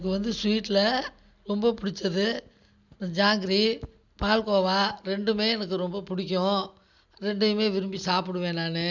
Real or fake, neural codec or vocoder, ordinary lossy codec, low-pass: real; none; none; 7.2 kHz